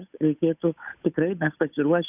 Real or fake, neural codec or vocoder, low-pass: fake; codec, 16 kHz, 8 kbps, FunCodec, trained on Chinese and English, 25 frames a second; 3.6 kHz